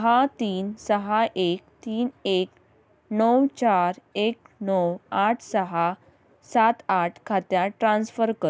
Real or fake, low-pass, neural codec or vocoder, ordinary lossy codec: real; none; none; none